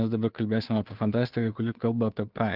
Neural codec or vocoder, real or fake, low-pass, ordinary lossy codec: codec, 16 kHz, 2 kbps, FunCodec, trained on Chinese and English, 25 frames a second; fake; 5.4 kHz; Opus, 32 kbps